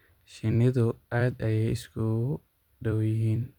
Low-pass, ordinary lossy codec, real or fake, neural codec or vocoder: 19.8 kHz; none; fake; vocoder, 44.1 kHz, 128 mel bands every 256 samples, BigVGAN v2